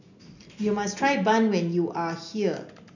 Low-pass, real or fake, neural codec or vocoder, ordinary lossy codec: 7.2 kHz; real; none; none